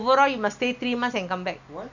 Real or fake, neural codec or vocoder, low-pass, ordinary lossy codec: real; none; 7.2 kHz; none